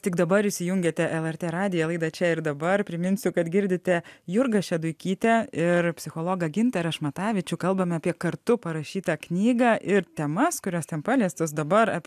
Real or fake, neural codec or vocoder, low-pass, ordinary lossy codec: real; none; 14.4 kHz; AAC, 96 kbps